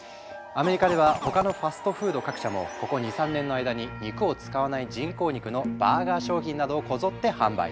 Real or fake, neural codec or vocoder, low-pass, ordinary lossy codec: real; none; none; none